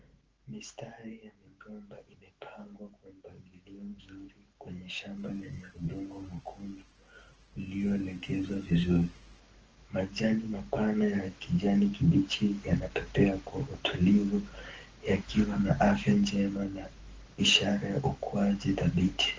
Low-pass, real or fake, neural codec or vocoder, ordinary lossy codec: 7.2 kHz; fake; autoencoder, 48 kHz, 128 numbers a frame, DAC-VAE, trained on Japanese speech; Opus, 16 kbps